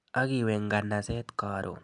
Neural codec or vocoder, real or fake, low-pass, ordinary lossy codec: none; real; 10.8 kHz; none